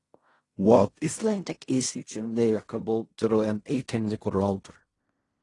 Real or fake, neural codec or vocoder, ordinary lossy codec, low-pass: fake; codec, 16 kHz in and 24 kHz out, 0.4 kbps, LongCat-Audio-Codec, fine tuned four codebook decoder; AAC, 32 kbps; 10.8 kHz